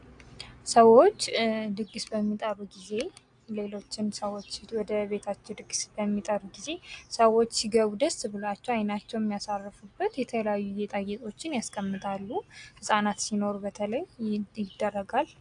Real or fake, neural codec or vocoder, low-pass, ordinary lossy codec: real; none; 9.9 kHz; AAC, 64 kbps